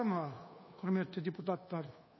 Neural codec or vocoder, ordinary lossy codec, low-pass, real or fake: codec, 24 kHz, 1.2 kbps, DualCodec; MP3, 24 kbps; 7.2 kHz; fake